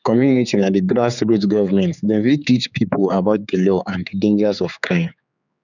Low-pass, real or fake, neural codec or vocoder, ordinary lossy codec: 7.2 kHz; fake; codec, 16 kHz, 4 kbps, X-Codec, HuBERT features, trained on general audio; none